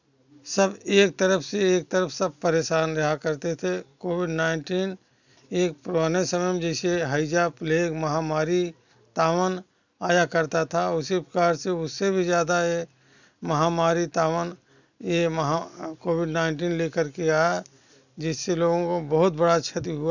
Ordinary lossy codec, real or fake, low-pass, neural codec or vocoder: none; real; 7.2 kHz; none